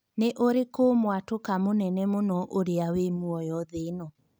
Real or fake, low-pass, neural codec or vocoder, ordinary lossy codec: real; none; none; none